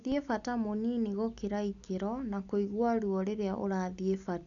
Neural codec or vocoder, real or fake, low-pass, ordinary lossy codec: none; real; 7.2 kHz; none